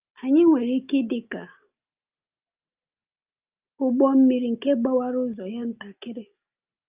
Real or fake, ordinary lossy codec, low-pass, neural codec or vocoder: real; Opus, 24 kbps; 3.6 kHz; none